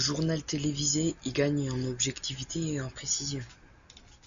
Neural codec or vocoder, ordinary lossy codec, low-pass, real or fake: none; MP3, 64 kbps; 7.2 kHz; real